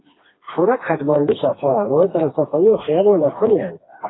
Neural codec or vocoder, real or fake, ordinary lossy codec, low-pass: codec, 16 kHz, 2 kbps, FreqCodec, smaller model; fake; AAC, 16 kbps; 7.2 kHz